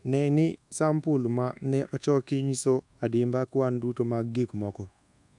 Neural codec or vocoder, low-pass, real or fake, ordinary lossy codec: codec, 24 kHz, 1.2 kbps, DualCodec; 10.8 kHz; fake; none